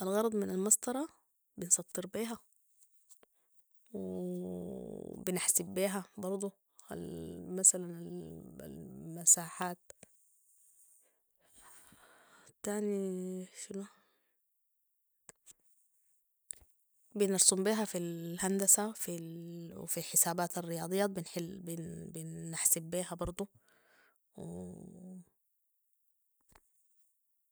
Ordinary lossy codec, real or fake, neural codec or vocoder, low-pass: none; real; none; none